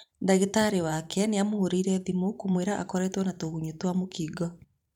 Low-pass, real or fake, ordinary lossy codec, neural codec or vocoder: 19.8 kHz; fake; none; vocoder, 44.1 kHz, 128 mel bands every 512 samples, BigVGAN v2